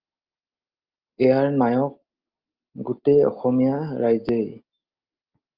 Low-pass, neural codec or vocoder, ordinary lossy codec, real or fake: 5.4 kHz; none; Opus, 32 kbps; real